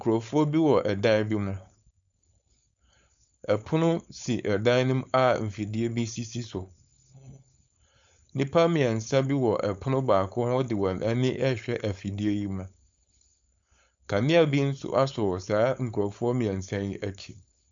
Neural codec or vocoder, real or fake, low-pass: codec, 16 kHz, 4.8 kbps, FACodec; fake; 7.2 kHz